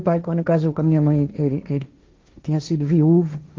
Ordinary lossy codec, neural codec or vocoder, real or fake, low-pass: Opus, 32 kbps; codec, 16 kHz, 1.1 kbps, Voila-Tokenizer; fake; 7.2 kHz